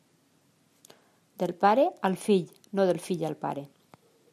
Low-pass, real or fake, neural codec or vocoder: 14.4 kHz; real; none